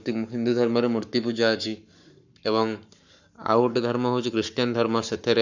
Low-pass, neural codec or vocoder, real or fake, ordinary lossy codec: 7.2 kHz; codec, 44.1 kHz, 7.8 kbps, Pupu-Codec; fake; none